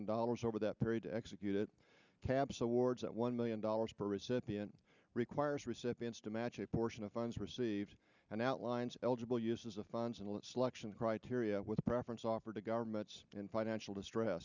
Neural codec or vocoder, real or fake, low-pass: none; real; 7.2 kHz